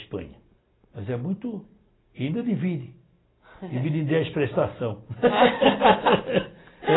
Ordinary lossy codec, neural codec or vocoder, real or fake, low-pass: AAC, 16 kbps; none; real; 7.2 kHz